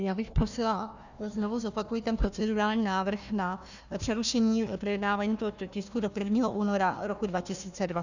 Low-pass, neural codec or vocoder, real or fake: 7.2 kHz; codec, 16 kHz, 1 kbps, FunCodec, trained on Chinese and English, 50 frames a second; fake